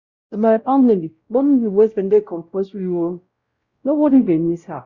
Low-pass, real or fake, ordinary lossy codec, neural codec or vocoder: 7.2 kHz; fake; Opus, 64 kbps; codec, 16 kHz, 0.5 kbps, X-Codec, WavLM features, trained on Multilingual LibriSpeech